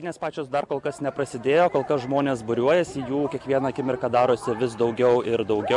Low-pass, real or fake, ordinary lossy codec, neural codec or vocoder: 10.8 kHz; real; MP3, 96 kbps; none